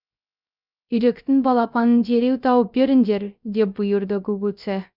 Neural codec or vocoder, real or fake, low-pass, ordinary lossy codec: codec, 16 kHz, 0.3 kbps, FocalCodec; fake; 5.4 kHz; none